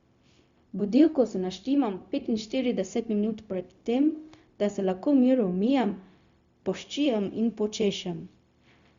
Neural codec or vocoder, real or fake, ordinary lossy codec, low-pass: codec, 16 kHz, 0.4 kbps, LongCat-Audio-Codec; fake; Opus, 64 kbps; 7.2 kHz